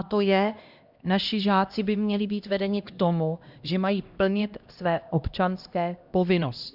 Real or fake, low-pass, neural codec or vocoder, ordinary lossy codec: fake; 5.4 kHz; codec, 16 kHz, 1 kbps, X-Codec, HuBERT features, trained on LibriSpeech; Opus, 64 kbps